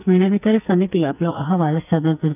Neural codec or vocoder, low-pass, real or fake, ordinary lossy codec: codec, 44.1 kHz, 2.6 kbps, SNAC; 3.6 kHz; fake; none